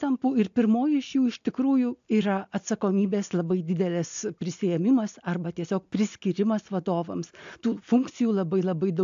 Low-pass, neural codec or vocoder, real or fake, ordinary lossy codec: 7.2 kHz; none; real; AAC, 64 kbps